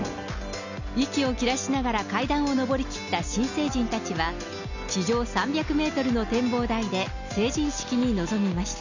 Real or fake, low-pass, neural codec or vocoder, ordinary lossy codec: real; 7.2 kHz; none; none